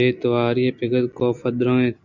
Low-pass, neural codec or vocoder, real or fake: 7.2 kHz; none; real